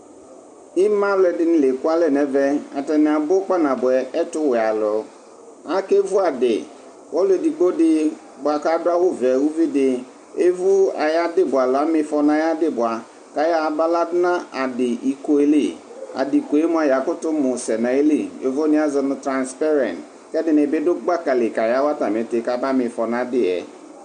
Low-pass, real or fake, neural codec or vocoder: 9.9 kHz; real; none